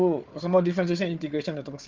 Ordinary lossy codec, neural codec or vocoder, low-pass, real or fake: Opus, 24 kbps; codec, 16 kHz, 4 kbps, FunCodec, trained on Chinese and English, 50 frames a second; 7.2 kHz; fake